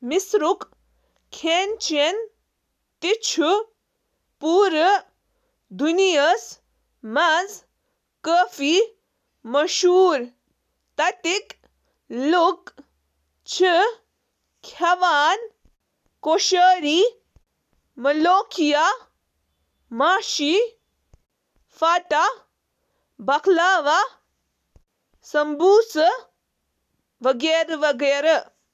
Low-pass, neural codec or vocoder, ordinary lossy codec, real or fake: 14.4 kHz; none; none; real